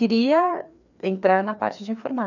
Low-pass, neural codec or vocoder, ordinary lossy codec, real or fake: 7.2 kHz; codec, 16 kHz, 2 kbps, FreqCodec, larger model; none; fake